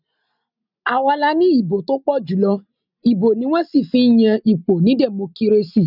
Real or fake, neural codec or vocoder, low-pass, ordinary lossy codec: real; none; 5.4 kHz; none